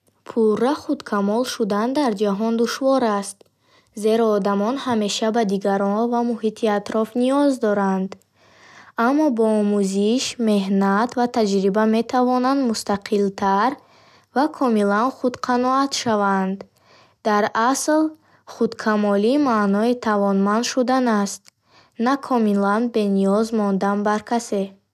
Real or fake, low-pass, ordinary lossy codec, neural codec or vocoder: real; 14.4 kHz; none; none